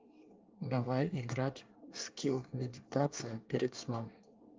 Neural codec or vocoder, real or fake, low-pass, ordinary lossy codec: codec, 24 kHz, 1 kbps, SNAC; fake; 7.2 kHz; Opus, 24 kbps